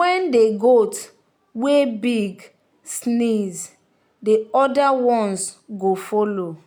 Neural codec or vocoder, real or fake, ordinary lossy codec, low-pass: none; real; none; none